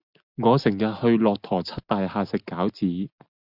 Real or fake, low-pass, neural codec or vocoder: real; 5.4 kHz; none